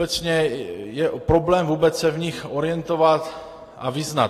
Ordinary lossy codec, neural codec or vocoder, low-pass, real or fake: AAC, 48 kbps; none; 14.4 kHz; real